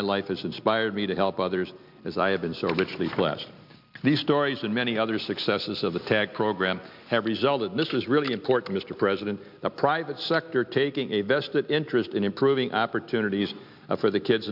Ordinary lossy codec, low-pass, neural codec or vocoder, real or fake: MP3, 48 kbps; 5.4 kHz; none; real